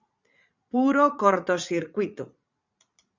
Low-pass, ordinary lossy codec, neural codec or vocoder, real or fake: 7.2 kHz; Opus, 64 kbps; vocoder, 44.1 kHz, 80 mel bands, Vocos; fake